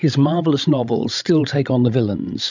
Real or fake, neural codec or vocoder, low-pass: fake; codec, 16 kHz, 16 kbps, FreqCodec, larger model; 7.2 kHz